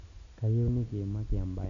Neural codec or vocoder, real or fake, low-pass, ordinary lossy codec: none; real; 7.2 kHz; none